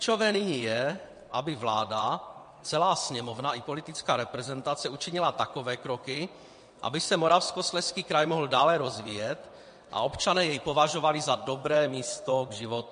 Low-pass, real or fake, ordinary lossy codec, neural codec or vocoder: 9.9 kHz; fake; MP3, 48 kbps; vocoder, 22.05 kHz, 80 mel bands, WaveNeXt